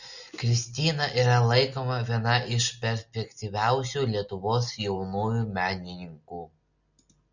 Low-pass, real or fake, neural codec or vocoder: 7.2 kHz; real; none